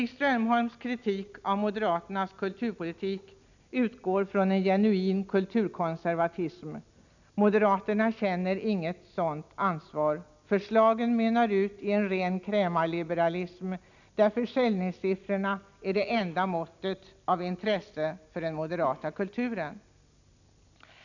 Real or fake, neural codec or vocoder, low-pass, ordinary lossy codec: real; none; 7.2 kHz; none